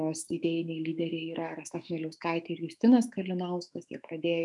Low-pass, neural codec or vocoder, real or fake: 10.8 kHz; autoencoder, 48 kHz, 128 numbers a frame, DAC-VAE, trained on Japanese speech; fake